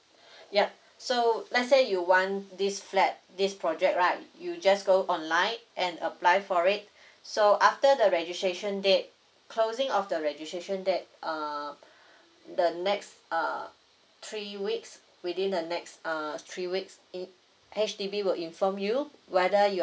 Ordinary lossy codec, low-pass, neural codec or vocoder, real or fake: none; none; none; real